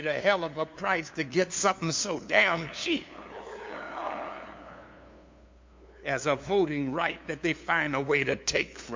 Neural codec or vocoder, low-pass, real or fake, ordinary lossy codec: codec, 16 kHz, 2 kbps, FunCodec, trained on LibriTTS, 25 frames a second; 7.2 kHz; fake; MP3, 48 kbps